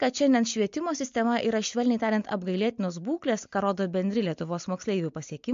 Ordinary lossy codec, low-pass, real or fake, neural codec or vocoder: MP3, 48 kbps; 7.2 kHz; real; none